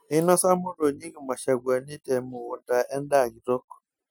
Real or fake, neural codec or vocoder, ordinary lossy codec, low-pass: real; none; none; none